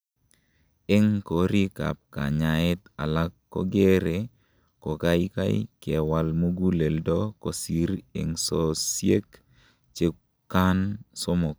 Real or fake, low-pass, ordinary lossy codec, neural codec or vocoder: real; none; none; none